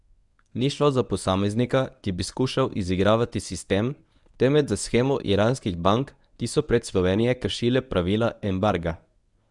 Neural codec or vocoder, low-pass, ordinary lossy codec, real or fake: codec, 24 kHz, 0.9 kbps, WavTokenizer, medium speech release version 1; 10.8 kHz; none; fake